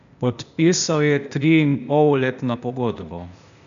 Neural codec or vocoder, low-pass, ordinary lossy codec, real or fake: codec, 16 kHz, 0.8 kbps, ZipCodec; 7.2 kHz; none; fake